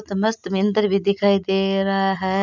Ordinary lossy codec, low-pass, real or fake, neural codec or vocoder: none; 7.2 kHz; real; none